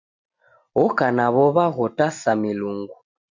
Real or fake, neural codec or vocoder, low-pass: real; none; 7.2 kHz